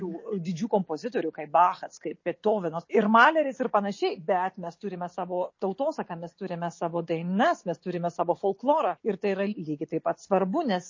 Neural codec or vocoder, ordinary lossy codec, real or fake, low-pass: none; MP3, 32 kbps; real; 7.2 kHz